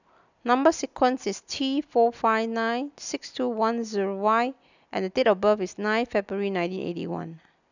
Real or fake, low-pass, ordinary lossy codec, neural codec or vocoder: real; 7.2 kHz; none; none